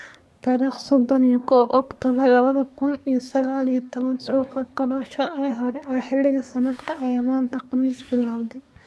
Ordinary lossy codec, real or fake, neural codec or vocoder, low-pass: none; fake; codec, 24 kHz, 1 kbps, SNAC; none